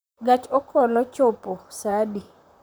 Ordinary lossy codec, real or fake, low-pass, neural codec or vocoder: none; fake; none; vocoder, 44.1 kHz, 128 mel bands, Pupu-Vocoder